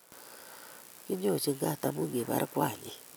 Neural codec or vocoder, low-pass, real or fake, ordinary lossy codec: none; none; real; none